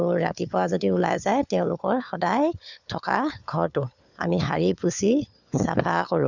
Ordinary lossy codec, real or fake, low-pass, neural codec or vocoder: none; fake; 7.2 kHz; codec, 16 kHz, 4 kbps, FunCodec, trained on LibriTTS, 50 frames a second